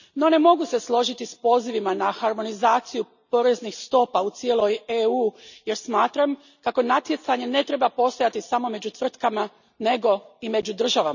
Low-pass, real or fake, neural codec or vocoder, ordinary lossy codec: 7.2 kHz; real; none; none